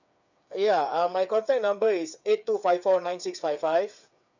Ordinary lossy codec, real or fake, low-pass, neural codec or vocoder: none; fake; 7.2 kHz; codec, 16 kHz, 8 kbps, FreqCodec, smaller model